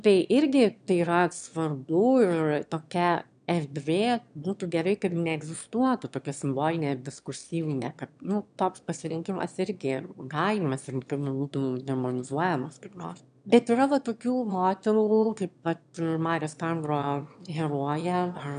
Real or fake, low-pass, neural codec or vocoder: fake; 9.9 kHz; autoencoder, 22.05 kHz, a latent of 192 numbers a frame, VITS, trained on one speaker